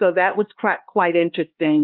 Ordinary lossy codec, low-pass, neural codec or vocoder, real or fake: Opus, 32 kbps; 5.4 kHz; codec, 16 kHz, 2 kbps, X-Codec, HuBERT features, trained on LibriSpeech; fake